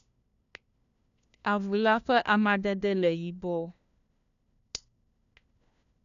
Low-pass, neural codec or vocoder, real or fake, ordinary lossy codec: 7.2 kHz; codec, 16 kHz, 1 kbps, FunCodec, trained on LibriTTS, 50 frames a second; fake; none